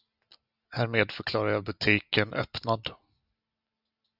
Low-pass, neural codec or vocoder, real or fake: 5.4 kHz; none; real